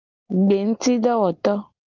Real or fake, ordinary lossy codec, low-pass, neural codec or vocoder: real; Opus, 24 kbps; 7.2 kHz; none